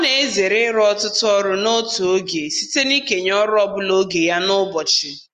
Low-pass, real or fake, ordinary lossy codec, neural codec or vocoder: 14.4 kHz; real; Opus, 32 kbps; none